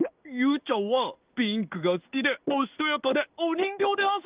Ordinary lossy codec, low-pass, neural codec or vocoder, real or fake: Opus, 24 kbps; 3.6 kHz; codec, 16 kHz in and 24 kHz out, 1 kbps, XY-Tokenizer; fake